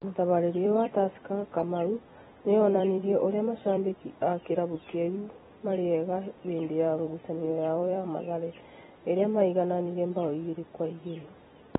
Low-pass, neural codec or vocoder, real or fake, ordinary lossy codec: 19.8 kHz; vocoder, 44.1 kHz, 128 mel bands every 256 samples, BigVGAN v2; fake; AAC, 16 kbps